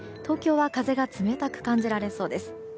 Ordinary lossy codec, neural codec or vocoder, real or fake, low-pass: none; none; real; none